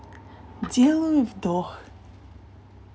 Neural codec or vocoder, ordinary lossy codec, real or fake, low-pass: none; none; real; none